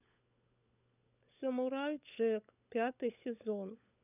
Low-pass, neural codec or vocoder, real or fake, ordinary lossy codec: 3.6 kHz; codec, 16 kHz, 16 kbps, FunCodec, trained on LibriTTS, 50 frames a second; fake; none